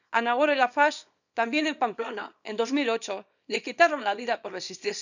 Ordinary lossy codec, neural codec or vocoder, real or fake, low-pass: none; codec, 24 kHz, 0.9 kbps, WavTokenizer, small release; fake; 7.2 kHz